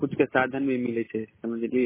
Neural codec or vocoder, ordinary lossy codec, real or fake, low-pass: none; MP3, 16 kbps; real; 3.6 kHz